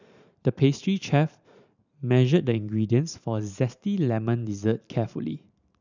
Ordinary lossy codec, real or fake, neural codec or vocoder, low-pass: none; fake; vocoder, 44.1 kHz, 128 mel bands every 512 samples, BigVGAN v2; 7.2 kHz